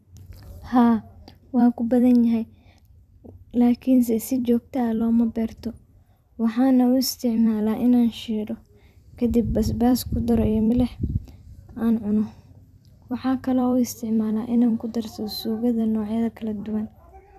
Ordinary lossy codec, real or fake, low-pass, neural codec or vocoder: none; fake; 14.4 kHz; vocoder, 44.1 kHz, 128 mel bands every 256 samples, BigVGAN v2